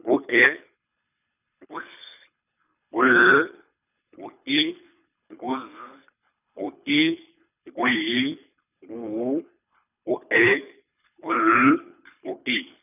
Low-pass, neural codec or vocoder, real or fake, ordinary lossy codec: 3.6 kHz; codec, 24 kHz, 3 kbps, HILCodec; fake; none